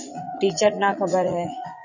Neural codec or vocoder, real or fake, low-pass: vocoder, 44.1 kHz, 80 mel bands, Vocos; fake; 7.2 kHz